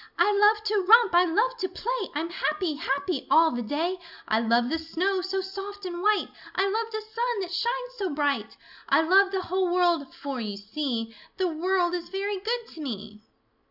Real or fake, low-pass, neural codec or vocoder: real; 5.4 kHz; none